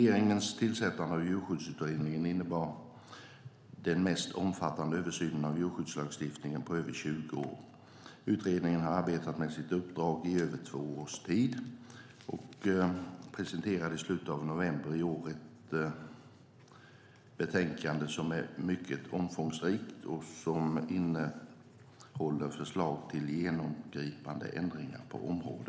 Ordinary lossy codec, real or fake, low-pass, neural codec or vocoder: none; real; none; none